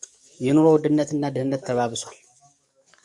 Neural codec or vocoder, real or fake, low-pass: codec, 44.1 kHz, 7.8 kbps, DAC; fake; 10.8 kHz